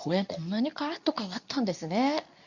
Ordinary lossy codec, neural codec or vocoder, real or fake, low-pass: none; codec, 24 kHz, 0.9 kbps, WavTokenizer, medium speech release version 2; fake; 7.2 kHz